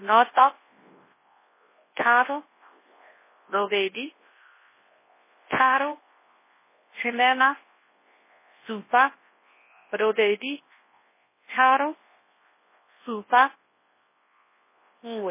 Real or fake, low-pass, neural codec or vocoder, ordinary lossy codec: fake; 3.6 kHz; codec, 24 kHz, 0.9 kbps, WavTokenizer, large speech release; MP3, 16 kbps